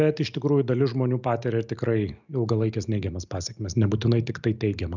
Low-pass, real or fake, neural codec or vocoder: 7.2 kHz; real; none